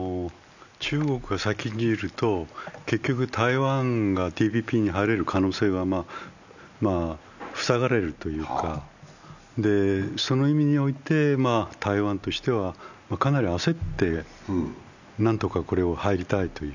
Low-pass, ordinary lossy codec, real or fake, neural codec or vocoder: 7.2 kHz; none; real; none